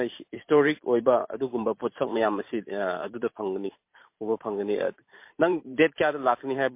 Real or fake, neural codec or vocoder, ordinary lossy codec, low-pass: fake; vocoder, 44.1 kHz, 128 mel bands every 512 samples, BigVGAN v2; MP3, 24 kbps; 3.6 kHz